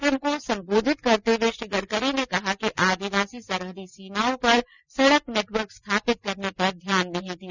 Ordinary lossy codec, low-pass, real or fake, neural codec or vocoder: none; 7.2 kHz; real; none